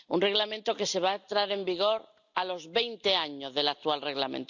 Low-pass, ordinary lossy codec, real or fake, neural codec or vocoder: 7.2 kHz; none; real; none